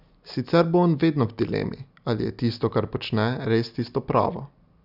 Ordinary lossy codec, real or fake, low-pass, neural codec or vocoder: none; real; 5.4 kHz; none